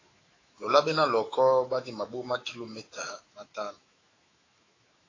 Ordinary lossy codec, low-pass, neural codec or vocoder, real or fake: AAC, 32 kbps; 7.2 kHz; autoencoder, 48 kHz, 128 numbers a frame, DAC-VAE, trained on Japanese speech; fake